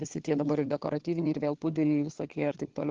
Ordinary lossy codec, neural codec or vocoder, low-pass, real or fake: Opus, 16 kbps; codec, 16 kHz, 4 kbps, FunCodec, trained on LibriTTS, 50 frames a second; 7.2 kHz; fake